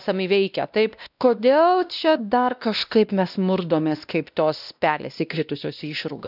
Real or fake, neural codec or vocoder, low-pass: fake; codec, 16 kHz, 1 kbps, X-Codec, WavLM features, trained on Multilingual LibriSpeech; 5.4 kHz